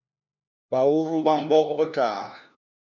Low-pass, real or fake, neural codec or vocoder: 7.2 kHz; fake; codec, 16 kHz, 1 kbps, FunCodec, trained on LibriTTS, 50 frames a second